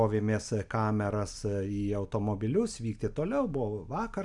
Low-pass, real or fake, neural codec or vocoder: 10.8 kHz; real; none